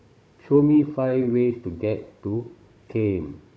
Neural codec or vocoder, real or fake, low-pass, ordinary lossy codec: codec, 16 kHz, 16 kbps, FunCodec, trained on Chinese and English, 50 frames a second; fake; none; none